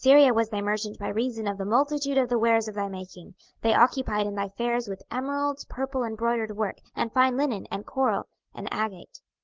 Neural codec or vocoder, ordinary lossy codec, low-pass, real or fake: none; Opus, 16 kbps; 7.2 kHz; real